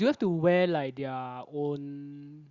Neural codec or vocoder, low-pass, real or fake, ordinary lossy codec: none; 7.2 kHz; real; Opus, 64 kbps